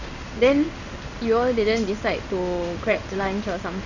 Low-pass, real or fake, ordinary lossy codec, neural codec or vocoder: 7.2 kHz; fake; none; codec, 16 kHz in and 24 kHz out, 1 kbps, XY-Tokenizer